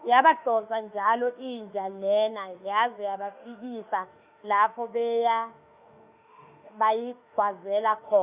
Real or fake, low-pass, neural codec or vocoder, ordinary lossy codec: fake; 3.6 kHz; autoencoder, 48 kHz, 32 numbers a frame, DAC-VAE, trained on Japanese speech; Opus, 64 kbps